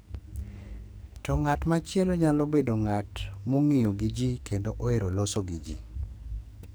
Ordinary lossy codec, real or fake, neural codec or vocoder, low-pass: none; fake; codec, 44.1 kHz, 2.6 kbps, SNAC; none